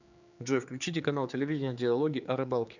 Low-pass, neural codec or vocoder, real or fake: 7.2 kHz; codec, 16 kHz, 4 kbps, X-Codec, HuBERT features, trained on general audio; fake